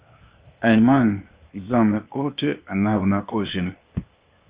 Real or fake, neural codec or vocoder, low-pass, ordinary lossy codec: fake; codec, 16 kHz, 0.8 kbps, ZipCodec; 3.6 kHz; Opus, 24 kbps